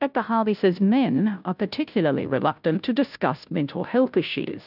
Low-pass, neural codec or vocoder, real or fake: 5.4 kHz; codec, 16 kHz, 0.5 kbps, FunCodec, trained on Chinese and English, 25 frames a second; fake